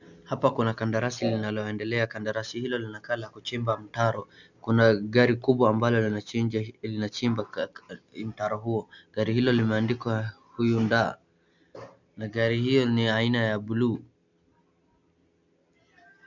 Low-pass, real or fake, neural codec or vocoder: 7.2 kHz; real; none